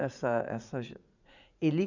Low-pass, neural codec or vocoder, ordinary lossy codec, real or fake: 7.2 kHz; vocoder, 44.1 kHz, 80 mel bands, Vocos; none; fake